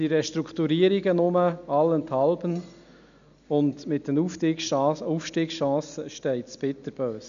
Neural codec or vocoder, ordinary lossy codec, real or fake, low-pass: none; none; real; 7.2 kHz